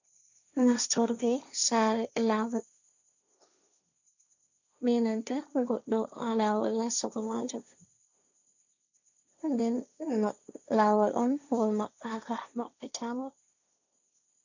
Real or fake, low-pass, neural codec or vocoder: fake; 7.2 kHz; codec, 16 kHz, 1.1 kbps, Voila-Tokenizer